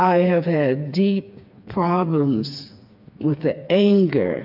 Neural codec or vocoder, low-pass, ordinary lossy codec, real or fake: codec, 16 kHz, 4 kbps, FreqCodec, smaller model; 5.4 kHz; AAC, 48 kbps; fake